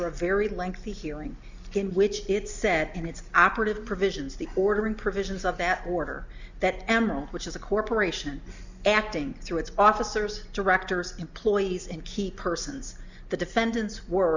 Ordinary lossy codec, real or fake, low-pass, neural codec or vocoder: Opus, 64 kbps; real; 7.2 kHz; none